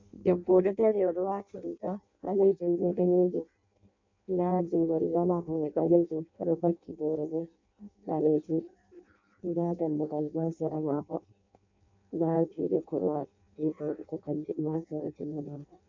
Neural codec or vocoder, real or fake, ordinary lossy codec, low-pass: codec, 16 kHz in and 24 kHz out, 0.6 kbps, FireRedTTS-2 codec; fake; MP3, 64 kbps; 7.2 kHz